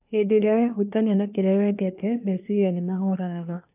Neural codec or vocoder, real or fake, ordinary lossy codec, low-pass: codec, 24 kHz, 1 kbps, SNAC; fake; none; 3.6 kHz